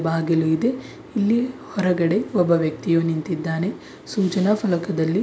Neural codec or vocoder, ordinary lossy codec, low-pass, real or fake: none; none; none; real